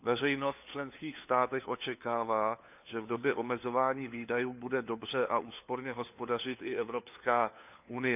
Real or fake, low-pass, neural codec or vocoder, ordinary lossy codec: fake; 3.6 kHz; codec, 16 kHz, 4 kbps, FunCodec, trained on LibriTTS, 50 frames a second; none